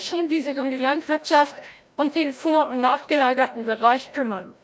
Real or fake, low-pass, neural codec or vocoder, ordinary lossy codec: fake; none; codec, 16 kHz, 0.5 kbps, FreqCodec, larger model; none